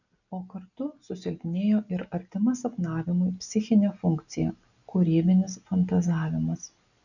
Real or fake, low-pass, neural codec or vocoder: real; 7.2 kHz; none